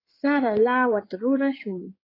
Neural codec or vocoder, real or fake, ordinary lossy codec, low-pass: codec, 16 kHz in and 24 kHz out, 2.2 kbps, FireRedTTS-2 codec; fake; AAC, 24 kbps; 5.4 kHz